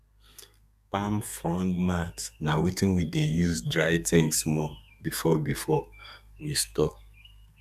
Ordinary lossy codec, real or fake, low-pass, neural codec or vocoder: none; fake; 14.4 kHz; codec, 32 kHz, 1.9 kbps, SNAC